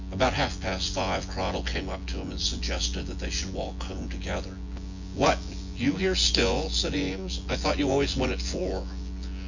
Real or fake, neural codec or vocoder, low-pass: fake; vocoder, 24 kHz, 100 mel bands, Vocos; 7.2 kHz